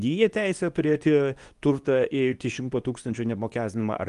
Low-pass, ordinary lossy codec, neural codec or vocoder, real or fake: 10.8 kHz; Opus, 32 kbps; codec, 24 kHz, 0.9 kbps, WavTokenizer, medium speech release version 2; fake